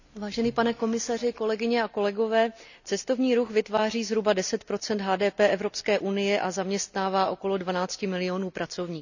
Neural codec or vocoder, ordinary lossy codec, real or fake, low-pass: none; none; real; 7.2 kHz